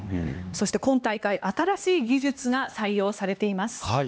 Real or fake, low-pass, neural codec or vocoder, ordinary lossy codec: fake; none; codec, 16 kHz, 2 kbps, X-Codec, HuBERT features, trained on LibriSpeech; none